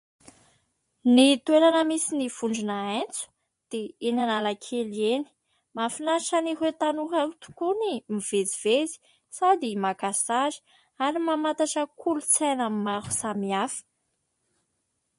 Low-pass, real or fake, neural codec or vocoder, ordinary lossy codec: 10.8 kHz; fake; vocoder, 24 kHz, 100 mel bands, Vocos; MP3, 48 kbps